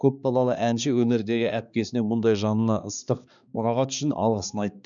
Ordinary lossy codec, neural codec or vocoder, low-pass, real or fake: none; codec, 16 kHz, 2 kbps, X-Codec, HuBERT features, trained on balanced general audio; 7.2 kHz; fake